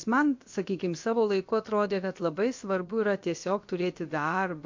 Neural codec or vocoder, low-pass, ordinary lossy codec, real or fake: codec, 16 kHz, about 1 kbps, DyCAST, with the encoder's durations; 7.2 kHz; MP3, 48 kbps; fake